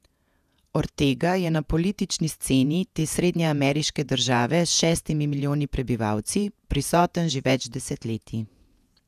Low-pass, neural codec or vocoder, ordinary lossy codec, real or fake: 14.4 kHz; vocoder, 48 kHz, 128 mel bands, Vocos; none; fake